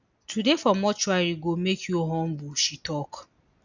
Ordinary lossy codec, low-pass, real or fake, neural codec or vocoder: none; 7.2 kHz; real; none